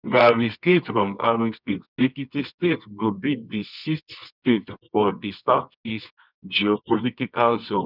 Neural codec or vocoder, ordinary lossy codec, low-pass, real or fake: codec, 24 kHz, 0.9 kbps, WavTokenizer, medium music audio release; none; 5.4 kHz; fake